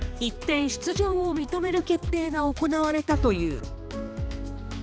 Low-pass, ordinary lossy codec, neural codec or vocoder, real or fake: none; none; codec, 16 kHz, 2 kbps, X-Codec, HuBERT features, trained on balanced general audio; fake